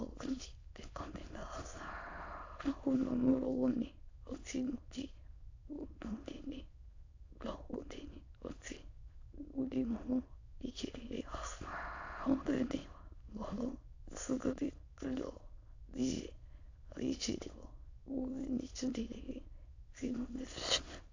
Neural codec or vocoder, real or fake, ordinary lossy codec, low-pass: autoencoder, 22.05 kHz, a latent of 192 numbers a frame, VITS, trained on many speakers; fake; AAC, 48 kbps; 7.2 kHz